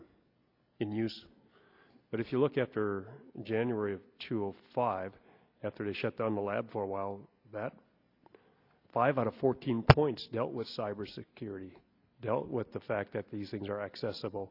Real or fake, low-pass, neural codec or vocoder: real; 5.4 kHz; none